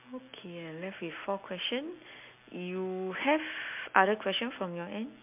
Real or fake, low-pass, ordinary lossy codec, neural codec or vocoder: real; 3.6 kHz; MP3, 32 kbps; none